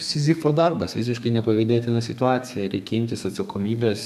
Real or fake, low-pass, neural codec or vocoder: fake; 14.4 kHz; codec, 32 kHz, 1.9 kbps, SNAC